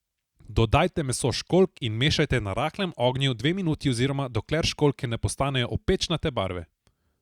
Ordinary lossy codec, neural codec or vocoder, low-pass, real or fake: Opus, 64 kbps; none; 19.8 kHz; real